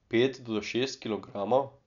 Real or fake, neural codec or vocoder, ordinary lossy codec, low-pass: real; none; none; 7.2 kHz